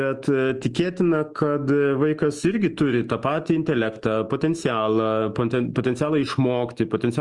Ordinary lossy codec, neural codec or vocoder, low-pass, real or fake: Opus, 24 kbps; autoencoder, 48 kHz, 128 numbers a frame, DAC-VAE, trained on Japanese speech; 10.8 kHz; fake